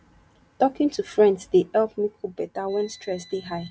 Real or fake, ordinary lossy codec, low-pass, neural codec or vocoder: real; none; none; none